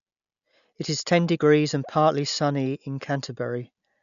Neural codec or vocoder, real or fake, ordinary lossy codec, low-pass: none; real; none; 7.2 kHz